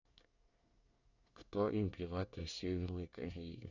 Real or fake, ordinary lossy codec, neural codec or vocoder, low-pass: fake; none; codec, 24 kHz, 1 kbps, SNAC; 7.2 kHz